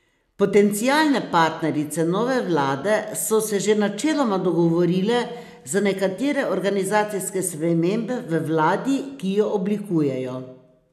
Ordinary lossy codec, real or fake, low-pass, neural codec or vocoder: none; real; 14.4 kHz; none